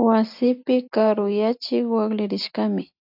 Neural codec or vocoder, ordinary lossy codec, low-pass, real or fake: none; AAC, 48 kbps; 5.4 kHz; real